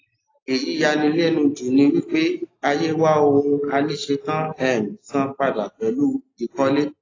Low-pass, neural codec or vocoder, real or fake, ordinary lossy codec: 7.2 kHz; none; real; AAC, 32 kbps